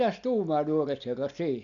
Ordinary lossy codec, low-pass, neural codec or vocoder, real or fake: none; 7.2 kHz; none; real